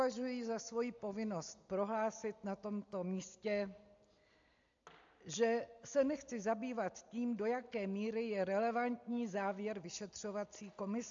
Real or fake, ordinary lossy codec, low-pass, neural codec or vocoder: real; AAC, 64 kbps; 7.2 kHz; none